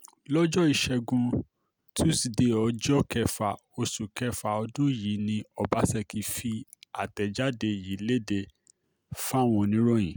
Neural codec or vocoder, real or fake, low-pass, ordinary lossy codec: none; real; none; none